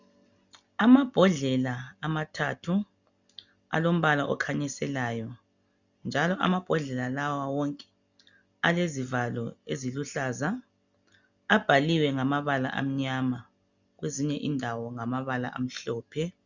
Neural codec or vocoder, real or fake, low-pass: none; real; 7.2 kHz